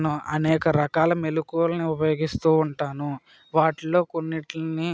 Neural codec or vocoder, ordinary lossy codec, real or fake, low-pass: none; none; real; none